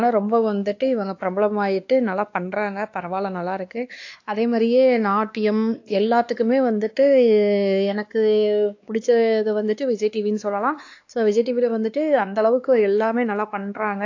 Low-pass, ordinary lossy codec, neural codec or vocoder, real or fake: 7.2 kHz; AAC, 48 kbps; codec, 16 kHz, 2 kbps, X-Codec, WavLM features, trained on Multilingual LibriSpeech; fake